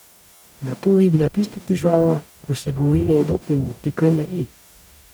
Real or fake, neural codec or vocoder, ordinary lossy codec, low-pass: fake; codec, 44.1 kHz, 0.9 kbps, DAC; none; none